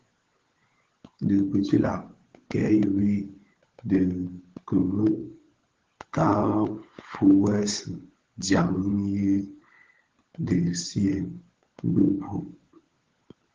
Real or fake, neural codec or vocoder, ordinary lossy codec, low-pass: fake; codec, 16 kHz, 16 kbps, FunCodec, trained on LibriTTS, 50 frames a second; Opus, 16 kbps; 7.2 kHz